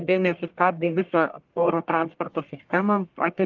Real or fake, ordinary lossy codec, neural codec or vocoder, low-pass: fake; Opus, 32 kbps; codec, 44.1 kHz, 1.7 kbps, Pupu-Codec; 7.2 kHz